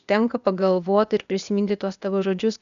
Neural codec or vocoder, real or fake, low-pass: codec, 16 kHz, 0.8 kbps, ZipCodec; fake; 7.2 kHz